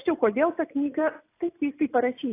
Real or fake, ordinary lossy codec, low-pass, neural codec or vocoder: real; AAC, 24 kbps; 3.6 kHz; none